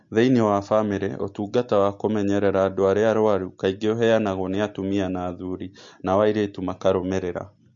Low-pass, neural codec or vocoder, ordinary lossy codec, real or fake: 7.2 kHz; none; MP3, 48 kbps; real